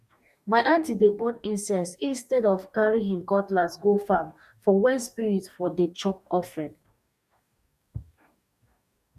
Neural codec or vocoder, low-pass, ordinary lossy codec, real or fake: codec, 44.1 kHz, 2.6 kbps, DAC; 14.4 kHz; none; fake